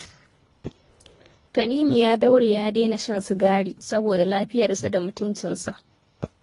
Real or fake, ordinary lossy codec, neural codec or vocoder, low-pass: fake; AAC, 32 kbps; codec, 24 kHz, 1.5 kbps, HILCodec; 10.8 kHz